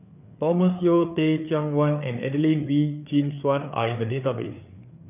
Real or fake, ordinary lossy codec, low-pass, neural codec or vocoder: fake; none; 3.6 kHz; codec, 16 kHz, 4 kbps, FreqCodec, larger model